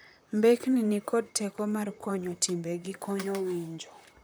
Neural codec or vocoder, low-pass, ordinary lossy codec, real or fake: vocoder, 44.1 kHz, 128 mel bands, Pupu-Vocoder; none; none; fake